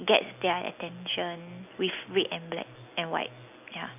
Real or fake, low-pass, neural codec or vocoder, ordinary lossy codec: real; 3.6 kHz; none; none